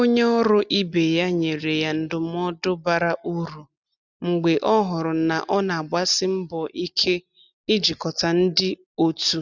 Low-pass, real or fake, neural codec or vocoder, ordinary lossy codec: 7.2 kHz; real; none; none